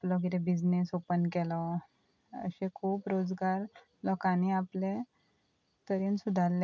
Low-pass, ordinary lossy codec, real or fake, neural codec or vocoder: 7.2 kHz; none; real; none